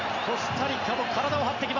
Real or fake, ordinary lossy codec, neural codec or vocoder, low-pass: real; none; none; 7.2 kHz